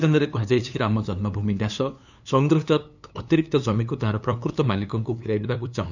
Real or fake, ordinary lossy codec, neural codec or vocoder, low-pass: fake; none; codec, 16 kHz, 2 kbps, FunCodec, trained on LibriTTS, 25 frames a second; 7.2 kHz